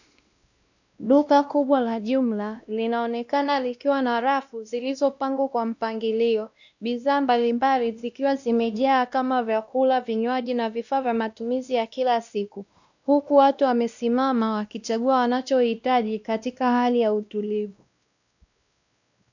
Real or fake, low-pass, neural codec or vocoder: fake; 7.2 kHz; codec, 16 kHz, 1 kbps, X-Codec, WavLM features, trained on Multilingual LibriSpeech